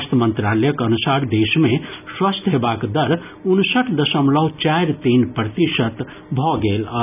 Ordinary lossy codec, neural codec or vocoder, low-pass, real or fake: none; none; 3.6 kHz; real